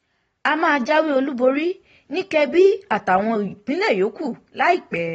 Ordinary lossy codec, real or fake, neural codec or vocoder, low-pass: AAC, 24 kbps; real; none; 10.8 kHz